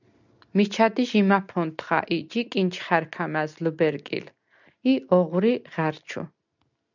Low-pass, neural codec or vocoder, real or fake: 7.2 kHz; none; real